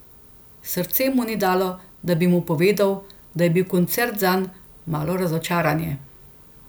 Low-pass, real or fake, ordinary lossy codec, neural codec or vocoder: none; real; none; none